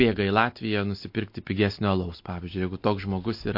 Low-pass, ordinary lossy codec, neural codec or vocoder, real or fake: 5.4 kHz; MP3, 32 kbps; none; real